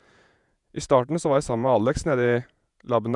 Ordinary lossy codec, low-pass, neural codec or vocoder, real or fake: none; 10.8 kHz; vocoder, 48 kHz, 128 mel bands, Vocos; fake